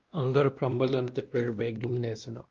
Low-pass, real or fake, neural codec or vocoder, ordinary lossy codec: 7.2 kHz; fake; codec, 16 kHz, 1 kbps, X-Codec, WavLM features, trained on Multilingual LibriSpeech; Opus, 32 kbps